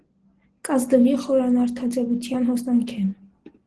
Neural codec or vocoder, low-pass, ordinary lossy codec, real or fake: none; 10.8 kHz; Opus, 16 kbps; real